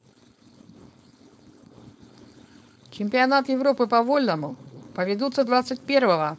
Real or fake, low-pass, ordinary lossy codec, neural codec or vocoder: fake; none; none; codec, 16 kHz, 4.8 kbps, FACodec